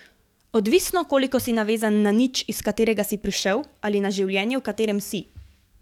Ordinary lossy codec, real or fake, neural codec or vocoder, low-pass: none; fake; codec, 44.1 kHz, 7.8 kbps, DAC; 19.8 kHz